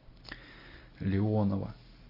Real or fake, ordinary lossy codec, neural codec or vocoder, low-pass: real; AAC, 32 kbps; none; 5.4 kHz